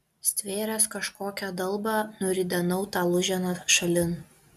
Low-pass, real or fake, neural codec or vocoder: 14.4 kHz; real; none